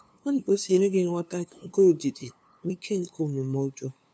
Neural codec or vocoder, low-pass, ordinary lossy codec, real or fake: codec, 16 kHz, 2 kbps, FunCodec, trained on LibriTTS, 25 frames a second; none; none; fake